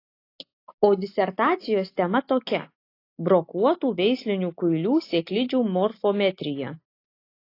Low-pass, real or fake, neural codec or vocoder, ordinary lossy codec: 5.4 kHz; real; none; AAC, 32 kbps